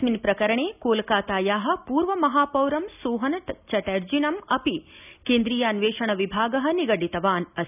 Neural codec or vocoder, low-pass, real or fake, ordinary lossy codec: none; 3.6 kHz; real; none